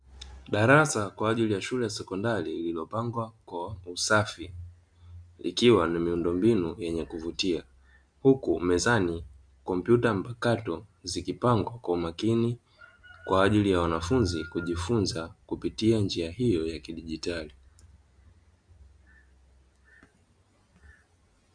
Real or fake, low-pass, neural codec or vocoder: real; 9.9 kHz; none